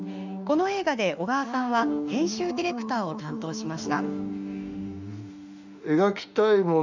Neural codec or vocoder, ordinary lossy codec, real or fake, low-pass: autoencoder, 48 kHz, 32 numbers a frame, DAC-VAE, trained on Japanese speech; none; fake; 7.2 kHz